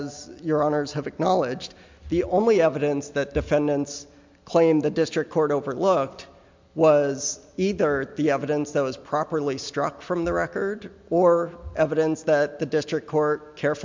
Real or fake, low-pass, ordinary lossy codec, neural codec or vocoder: real; 7.2 kHz; MP3, 64 kbps; none